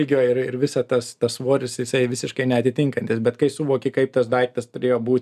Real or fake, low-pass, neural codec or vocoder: real; 14.4 kHz; none